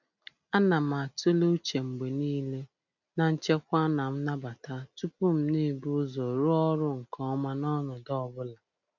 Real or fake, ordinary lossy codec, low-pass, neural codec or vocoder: real; none; 7.2 kHz; none